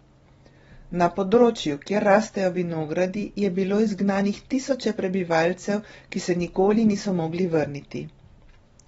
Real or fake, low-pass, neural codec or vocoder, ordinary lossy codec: real; 10.8 kHz; none; AAC, 24 kbps